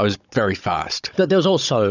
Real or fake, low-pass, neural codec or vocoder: fake; 7.2 kHz; codec, 16 kHz, 16 kbps, FunCodec, trained on Chinese and English, 50 frames a second